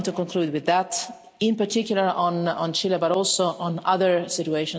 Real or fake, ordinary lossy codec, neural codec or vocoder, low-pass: real; none; none; none